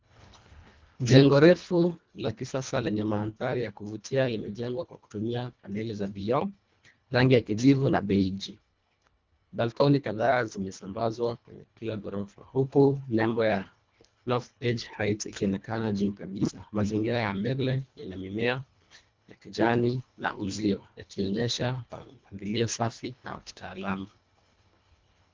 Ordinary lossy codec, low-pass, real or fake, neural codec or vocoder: Opus, 24 kbps; 7.2 kHz; fake; codec, 24 kHz, 1.5 kbps, HILCodec